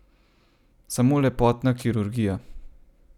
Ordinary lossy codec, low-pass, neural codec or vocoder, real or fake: none; 19.8 kHz; none; real